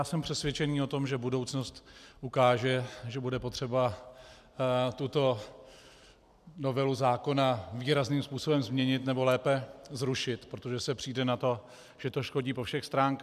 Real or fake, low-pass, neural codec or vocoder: real; 14.4 kHz; none